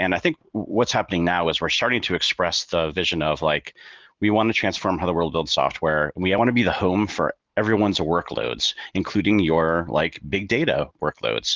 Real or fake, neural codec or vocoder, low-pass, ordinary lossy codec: real; none; 7.2 kHz; Opus, 16 kbps